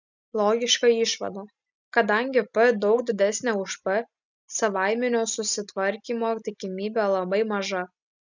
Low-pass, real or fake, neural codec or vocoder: 7.2 kHz; real; none